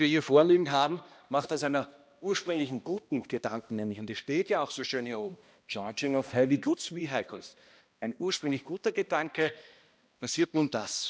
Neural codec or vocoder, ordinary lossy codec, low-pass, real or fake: codec, 16 kHz, 1 kbps, X-Codec, HuBERT features, trained on balanced general audio; none; none; fake